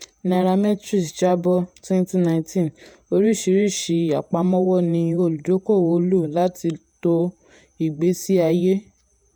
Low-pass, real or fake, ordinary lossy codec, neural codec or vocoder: none; fake; none; vocoder, 48 kHz, 128 mel bands, Vocos